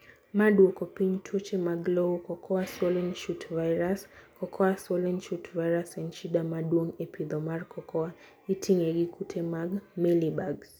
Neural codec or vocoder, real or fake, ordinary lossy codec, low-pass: none; real; none; none